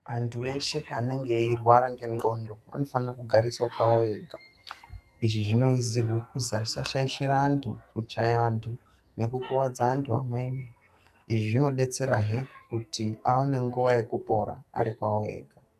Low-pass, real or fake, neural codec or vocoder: 14.4 kHz; fake; codec, 44.1 kHz, 2.6 kbps, SNAC